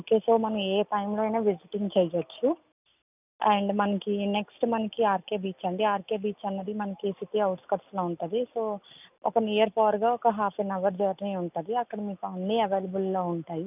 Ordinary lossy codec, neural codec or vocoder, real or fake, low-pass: none; none; real; 3.6 kHz